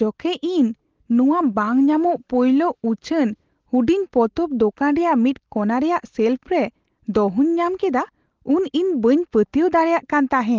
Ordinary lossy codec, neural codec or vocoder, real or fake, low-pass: Opus, 16 kbps; none; real; 7.2 kHz